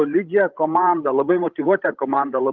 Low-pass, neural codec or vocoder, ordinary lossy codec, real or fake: 7.2 kHz; codec, 16 kHz, 16 kbps, FreqCodec, larger model; Opus, 32 kbps; fake